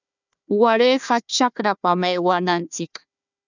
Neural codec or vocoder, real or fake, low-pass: codec, 16 kHz, 1 kbps, FunCodec, trained on Chinese and English, 50 frames a second; fake; 7.2 kHz